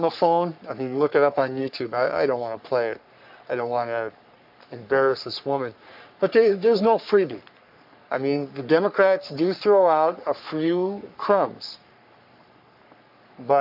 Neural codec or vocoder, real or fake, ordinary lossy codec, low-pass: codec, 44.1 kHz, 3.4 kbps, Pupu-Codec; fake; MP3, 48 kbps; 5.4 kHz